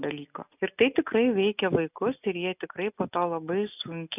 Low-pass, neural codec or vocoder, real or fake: 3.6 kHz; none; real